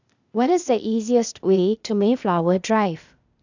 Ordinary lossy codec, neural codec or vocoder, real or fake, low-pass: none; codec, 16 kHz, 0.8 kbps, ZipCodec; fake; 7.2 kHz